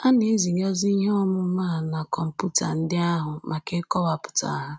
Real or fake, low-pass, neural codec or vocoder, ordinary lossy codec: real; none; none; none